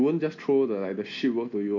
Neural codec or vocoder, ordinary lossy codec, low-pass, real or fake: none; none; 7.2 kHz; real